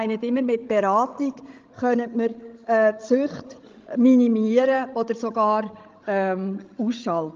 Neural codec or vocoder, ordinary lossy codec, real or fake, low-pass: codec, 16 kHz, 16 kbps, FreqCodec, larger model; Opus, 24 kbps; fake; 7.2 kHz